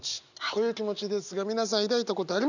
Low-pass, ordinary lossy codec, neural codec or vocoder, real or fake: 7.2 kHz; none; none; real